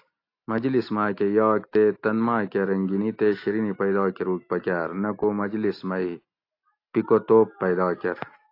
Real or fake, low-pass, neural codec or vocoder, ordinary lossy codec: real; 5.4 kHz; none; AAC, 32 kbps